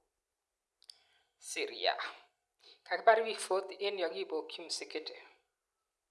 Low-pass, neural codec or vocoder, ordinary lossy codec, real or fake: none; none; none; real